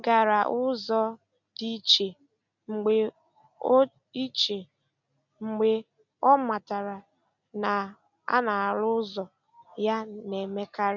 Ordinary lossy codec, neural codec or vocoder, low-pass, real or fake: none; none; 7.2 kHz; real